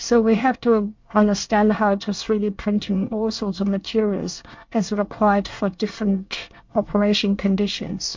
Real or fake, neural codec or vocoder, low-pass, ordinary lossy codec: fake; codec, 24 kHz, 1 kbps, SNAC; 7.2 kHz; MP3, 48 kbps